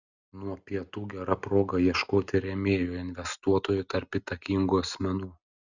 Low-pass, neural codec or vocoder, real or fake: 7.2 kHz; none; real